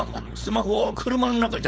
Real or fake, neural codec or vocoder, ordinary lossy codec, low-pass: fake; codec, 16 kHz, 4.8 kbps, FACodec; none; none